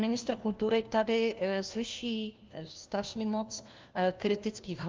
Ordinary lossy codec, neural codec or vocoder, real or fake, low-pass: Opus, 24 kbps; codec, 16 kHz, 1 kbps, FunCodec, trained on LibriTTS, 50 frames a second; fake; 7.2 kHz